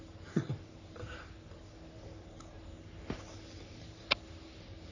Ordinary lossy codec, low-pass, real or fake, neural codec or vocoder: none; 7.2 kHz; fake; codec, 44.1 kHz, 3.4 kbps, Pupu-Codec